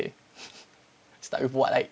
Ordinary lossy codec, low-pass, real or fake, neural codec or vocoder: none; none; real; none